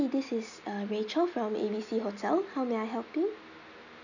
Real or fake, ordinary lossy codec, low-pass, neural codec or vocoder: real; none; 7.2 kHz; none